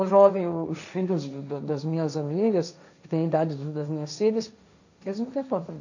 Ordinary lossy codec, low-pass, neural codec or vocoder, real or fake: none; 7.2 kHz; codec, 16 kHz, 1.1 kbps, Voila-Tokenizer; fake